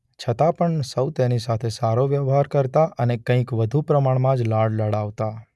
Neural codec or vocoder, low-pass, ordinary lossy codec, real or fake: none; none; none; real